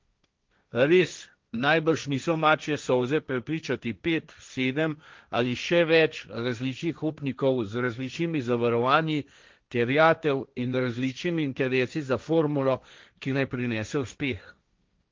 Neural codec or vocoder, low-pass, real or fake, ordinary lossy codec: codec, 16 kHz, 1.1 kbps, Voila-Tokenizer; 7.2 kHz; fake; Opus, 24 kbps